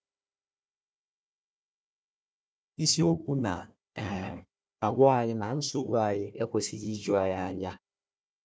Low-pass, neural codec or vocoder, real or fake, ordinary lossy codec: none; codec, 16 kHz, 1 kbps, FunCodec, trained on Chinese and English, 50 frames a second; fake; none